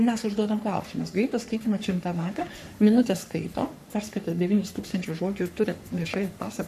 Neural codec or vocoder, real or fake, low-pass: codec, 44.1 kHz, 3.4 kbps, Pupu-Codec; fake; 14.4 kHz